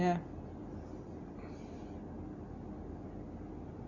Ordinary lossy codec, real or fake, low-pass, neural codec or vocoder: none; real; 7.2 kHz; none